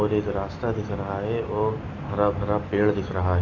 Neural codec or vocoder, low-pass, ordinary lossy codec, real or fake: none; 7.2 kHz; AAC, 32 kbps; real